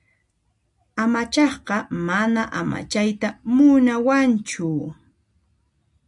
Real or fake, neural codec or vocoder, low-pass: real; none; 10.8 kHz